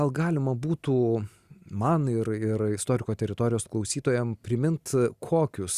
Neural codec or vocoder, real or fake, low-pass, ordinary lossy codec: none; real; 14.4 kHz; Opus, 64 kbps